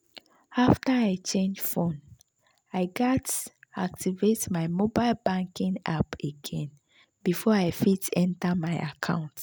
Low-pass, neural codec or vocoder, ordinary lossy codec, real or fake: none; none; none; real